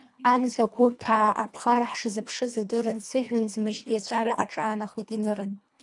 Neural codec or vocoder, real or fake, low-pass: codec, 24 kHz, 1.5 kbps, HILCodec; fake; 10.8 kHz